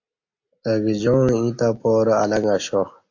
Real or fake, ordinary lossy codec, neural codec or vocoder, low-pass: real; AAC, 48 kbps; none; 7.2 kHz